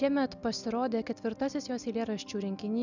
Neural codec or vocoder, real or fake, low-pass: none; real; 7.2 kHz